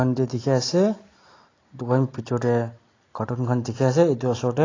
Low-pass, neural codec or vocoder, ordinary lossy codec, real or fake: 7.2 kHz; none; AAC, 32 kbps; real